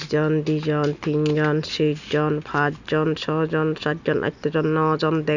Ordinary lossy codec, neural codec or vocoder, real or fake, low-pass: none; none; real; 7.2 kHz